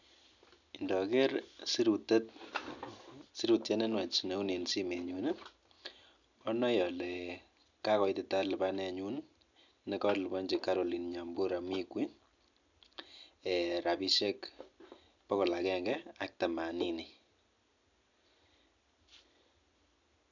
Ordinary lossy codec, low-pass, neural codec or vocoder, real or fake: none; 7.2 kHz; none; real